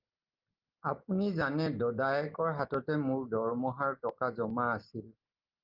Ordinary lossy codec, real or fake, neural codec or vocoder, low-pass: Opus, 32 kbps; real; none; 5.4 kHz